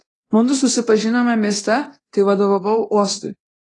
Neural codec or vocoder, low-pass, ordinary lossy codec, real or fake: codec, 24 kHz, 0.9 kbps, DualCodec; 10.8 kHz; AAC, 32 kbps; fake